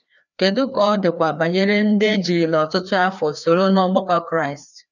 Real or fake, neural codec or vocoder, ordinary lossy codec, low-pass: fake; codec, 16 kHz, 2 kbps, FreqCodec, larger model; none; 7.2 kHz